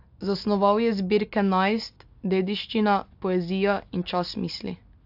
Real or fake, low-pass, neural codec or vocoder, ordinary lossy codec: real; 5.4 kHz; none; AAC, 48 kbps